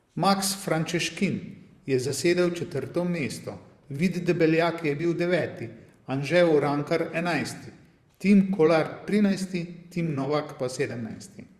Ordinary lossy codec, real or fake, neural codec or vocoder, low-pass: Opus, 64 kbps; fake; vocoder, 44.1 kHz, 128 mel bands, Pupu-Vocoder; 14.4 kHz